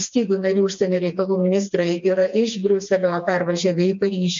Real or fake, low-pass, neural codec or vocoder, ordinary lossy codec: fake; 7.2 kHz; codec, 16 kHz, 2 kbps, FreqCodec, smaller model; MP3, 48 kbps